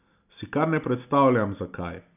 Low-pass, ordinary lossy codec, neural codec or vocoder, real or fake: 3.6 kHz; none; none; real